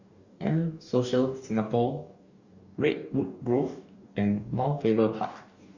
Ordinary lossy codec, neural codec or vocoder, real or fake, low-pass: Opus, 64 kbps; codec, 44.1 kHz, 2.6 kbps, DAC; fake; 7.2 kHz